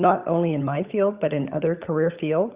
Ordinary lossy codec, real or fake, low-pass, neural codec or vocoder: Opus, 64 kbps; fake; 3.6 kHz; codec, 16 kHz, 16 kbps, FunCodec, trained on LibriTTS, 50 frames a second